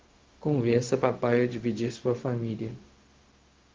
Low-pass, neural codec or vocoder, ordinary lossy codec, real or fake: 7.2 kHz; codec, 16 kHz, 0.4 kbps, LongCat-Audio-Codec; Opus, 16 kbps; fake